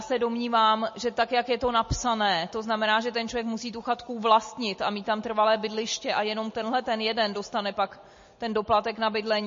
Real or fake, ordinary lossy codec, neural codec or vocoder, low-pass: real; MP3, 32 kbps; none; 7.2 kHz